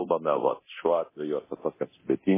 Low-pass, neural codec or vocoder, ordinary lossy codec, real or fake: 3.6 kHz; codec, 24 kHz, 0.9 kbps, DualCodec; MP3, 16 kbps; fake